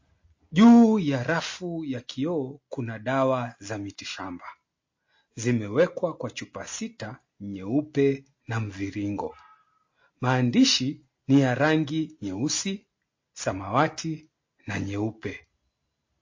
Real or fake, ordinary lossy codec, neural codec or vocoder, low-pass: real; MP3, 32 kbps; none; 7.2 kHz